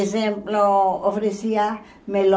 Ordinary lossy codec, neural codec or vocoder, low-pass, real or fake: none; none; none; real